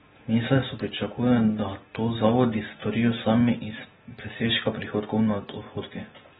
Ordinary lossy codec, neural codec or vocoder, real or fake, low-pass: AAC, 16 kbps; none; real; 19.8 kHz